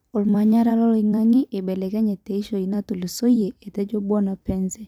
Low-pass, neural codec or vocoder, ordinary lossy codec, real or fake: 19.8 kHz; vocoder, 44.1 kHz, 128 mel bands every 256 samples, BigVGAN v2; none; fake